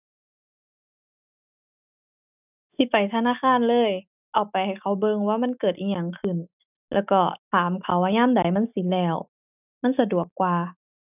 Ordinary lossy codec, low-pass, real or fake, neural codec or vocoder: none; 3.6 kHz; real; none